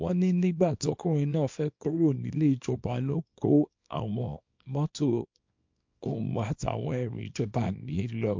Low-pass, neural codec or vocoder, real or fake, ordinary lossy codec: 7.2 kHz; codec, 24 kHz, 0.9 kbps, WavTokenizer, small release; fake; MP3, 48 kbps